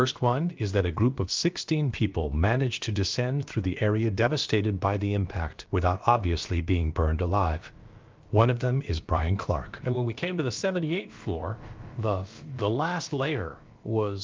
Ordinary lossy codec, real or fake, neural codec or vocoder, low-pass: Opus, 32 kbps; fake; codec, 16 kHz, about 1 kbps, DyCAST, with the encoder's durations; 7.2 kHz